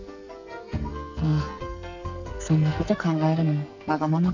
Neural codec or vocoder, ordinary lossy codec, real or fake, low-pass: codec, 44.1 kHz, 2.6 kbps, SNAC; none; fake; 7.2 kHz